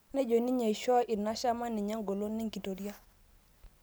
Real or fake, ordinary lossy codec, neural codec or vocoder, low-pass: real; none; none; none